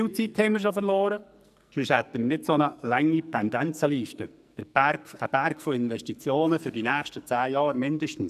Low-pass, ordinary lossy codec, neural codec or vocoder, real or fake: 14.4 kHz; none; codec, 44.1 kHz, 2.6 kbps, SNAC; fake